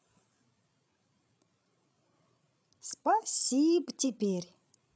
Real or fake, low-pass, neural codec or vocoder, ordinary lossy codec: fake; none; codec, 16 kHz, 16 kbps, FreqCodec, larger model; none